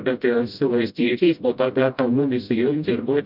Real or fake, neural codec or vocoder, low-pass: fake; codec, 16 kHz, 0.5 kbps, FreqCodec, smaller model; 5.4 kHz